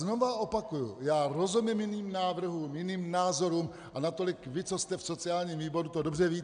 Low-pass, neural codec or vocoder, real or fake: 9.9 kHz; none; real